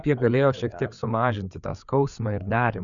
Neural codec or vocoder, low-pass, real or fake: codec, 16 kHz, 4 kbps, FreqCodec, larger model; 7.2 kHz; fake